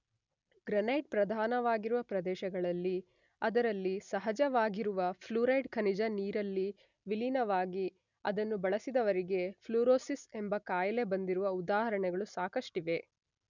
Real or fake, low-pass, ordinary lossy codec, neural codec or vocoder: real; 7.2 kHz; none; none